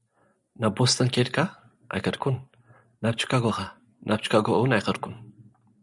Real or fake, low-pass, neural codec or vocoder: real; 10.8 kHz; none